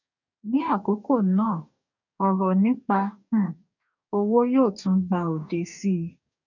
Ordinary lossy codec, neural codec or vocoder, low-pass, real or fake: none; codec, 44.1 kHz, 2.6 kbps, DAC; 7.2 kHz; fake